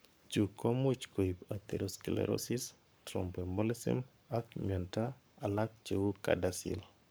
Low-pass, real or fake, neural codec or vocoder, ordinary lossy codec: none; fake; codec, 44.1 kHz, 7.8 kbps, Pupu-Codec; none